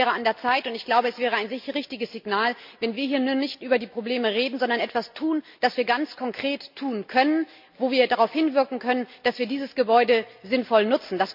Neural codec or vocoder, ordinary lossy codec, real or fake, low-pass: none; MP3, 48 kbps; real; 5.4 kHz